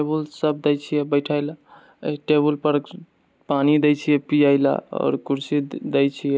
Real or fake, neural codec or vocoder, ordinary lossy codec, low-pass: real; none; none; none